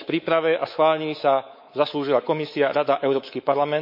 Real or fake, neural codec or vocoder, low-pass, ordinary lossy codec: fake; codec, 24 kHz, 3.1 kbps, DualCodec; 5.4 kHz; MP3, 32 kbps